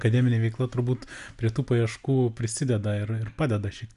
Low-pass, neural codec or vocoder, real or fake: 10.8 kHz; none; real